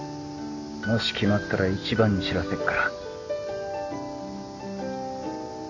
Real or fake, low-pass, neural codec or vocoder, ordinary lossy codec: real; 7.2 kHz; none; none